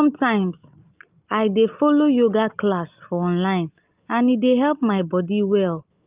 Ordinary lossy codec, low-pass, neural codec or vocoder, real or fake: Opus, 24 kbps; 3.6 kHz; none; real